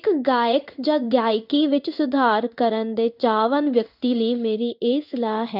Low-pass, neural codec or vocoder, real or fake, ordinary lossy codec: 5.4 kHz; codec, 16 kHz in and 24 kHz out, 1 kbps, XY-Tokenizer; fake; none